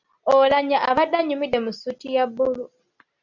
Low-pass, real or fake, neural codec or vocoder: 7.2 kHz; real; none